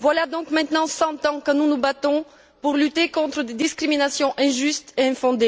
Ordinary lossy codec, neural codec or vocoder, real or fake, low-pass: none; none; real; none